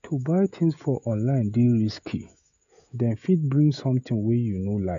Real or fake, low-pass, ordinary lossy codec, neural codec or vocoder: fake; 7.2 kHz; none; codec, 16 kHz, 16 kbps, FreqCodec, smaller model